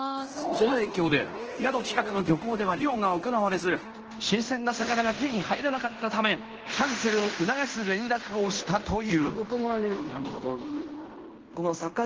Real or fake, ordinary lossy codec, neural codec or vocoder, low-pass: fake; Opus, 16 kbps; codec, 16 kHz in and 24 kHz out, 0.9 kbps, LongCat-Audio-Codec, fine tuned four codebook decoder; 7.2 kHz